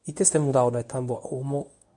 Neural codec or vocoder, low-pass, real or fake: codec, 24 kHz, 0.9 kbps, WavTokenizer, medium speech release version 1; 10.8 kHz; fake